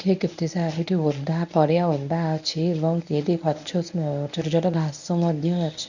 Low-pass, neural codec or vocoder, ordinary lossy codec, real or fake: 7.2 kHz; codec, 24 kHz, 0.9 kbps, WavTokenizer, medium speech release version 1; none; fake